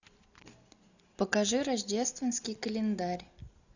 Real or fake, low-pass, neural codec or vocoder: real; 7.2 kHz; none